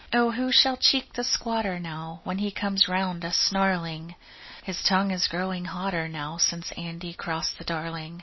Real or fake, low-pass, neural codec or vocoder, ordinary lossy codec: real; 7.2 kHz; none; MP3, 24 kbps